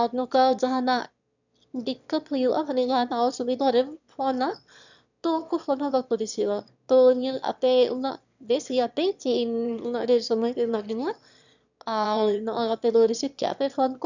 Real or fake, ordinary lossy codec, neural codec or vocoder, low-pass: fake; none; autoencoder, 22.05 kHz, a latent of 192 numbers a frame, VITS, trained on one speaker; 7.2 kHz